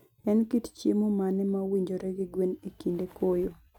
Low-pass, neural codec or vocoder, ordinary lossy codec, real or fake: 19.8 kHz; none; none; real